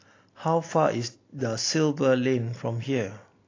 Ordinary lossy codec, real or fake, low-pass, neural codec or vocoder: AAC, 32 kbps; real; 7.2 kHz; none